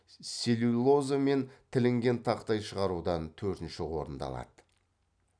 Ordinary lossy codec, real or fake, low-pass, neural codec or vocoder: none; real; 9.9 kHz; none